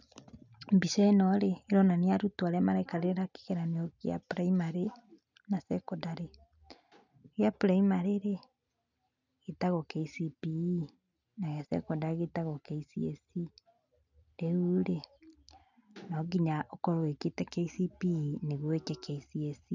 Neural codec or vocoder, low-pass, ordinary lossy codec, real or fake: none; 7.2 kHz; none; real